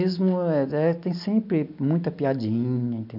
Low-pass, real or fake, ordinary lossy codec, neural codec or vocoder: 5.4 kHz; real; none; none